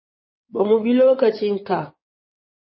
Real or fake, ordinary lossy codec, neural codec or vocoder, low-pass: fake; MP3, 24 kbps; codec, 16 kHz in and 24 kHz out, 2.2 kbps, FireRedTTS-2 codec; 7.2 kHz